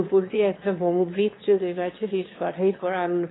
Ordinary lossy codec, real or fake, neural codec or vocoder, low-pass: AAC, 16 kbps; fake; autoencoder, 22.05 kHz, a latent of 192 numbers a frame, VITS, trained on one speaker; 7.2 kHz